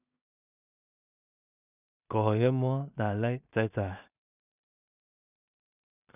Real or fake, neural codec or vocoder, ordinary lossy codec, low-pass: fake; codec, 16 kHz in and 24 kHz out, 0.4 kbps, LongCat-Audio-Codec, two codebook decoder; none; 3.6 kHz